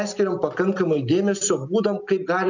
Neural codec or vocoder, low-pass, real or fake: none; 7.2 kHz; real